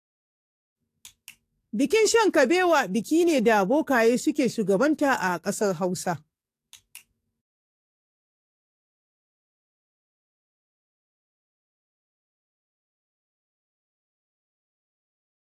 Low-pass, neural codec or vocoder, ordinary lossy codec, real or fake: 14.4 kHz; codec, 44.1 kHz, 7.8 kbps, DAC; AAC, 64 kbps; fake